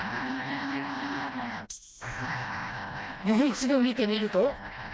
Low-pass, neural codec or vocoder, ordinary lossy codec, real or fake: none; codec, 16 kHz, 1 kbps, FreqCodec, smaller model; none; fake